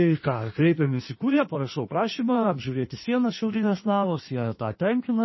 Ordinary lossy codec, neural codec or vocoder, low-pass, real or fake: MP3, 24 kbps; codec, 16 kHz in and 24 kHz out, 1.1 kbps, FireRedTTS-2 codec; 7.2 kHz; fake